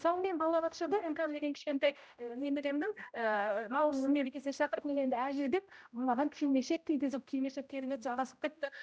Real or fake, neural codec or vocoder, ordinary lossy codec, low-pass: fake; codec, 16 kHz, 0.5 kbps, X-Codec, HuBERT features, trained on general audio; none; none